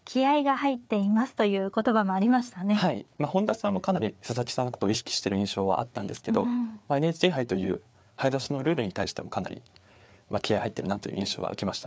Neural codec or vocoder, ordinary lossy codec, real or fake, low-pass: codec, 16 kHz, 4 kbps, FreqCodec, larger model; none; fake; none